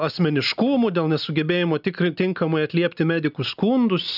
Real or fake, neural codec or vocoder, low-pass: real; none; 5.4 kHz